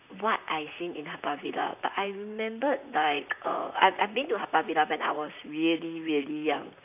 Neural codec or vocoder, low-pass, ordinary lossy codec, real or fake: vocoder, 44.1 kHz, 128 mel bands, Pupu-Vocoder; 3.6 kHz; none; fake